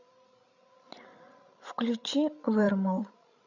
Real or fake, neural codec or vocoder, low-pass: fake; codec, 16 kHz, 16 kbps, FreqCodec, larger model; 7.2 kHz